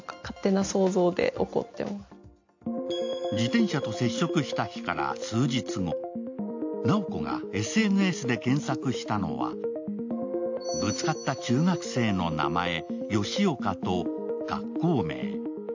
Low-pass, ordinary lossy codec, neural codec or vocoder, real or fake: 7.2 kHz; AAC, 48 kbps; none; real